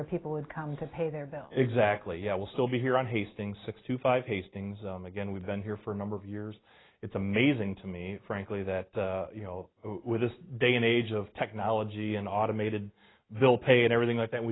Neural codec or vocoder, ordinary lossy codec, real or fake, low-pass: none; AAC, 16 kbps; real; 7.2 kHz